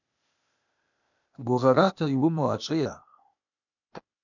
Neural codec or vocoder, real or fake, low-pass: codec, 16 kHz, 0.8 kbps, ZipCodec; fake; 7.2 kHz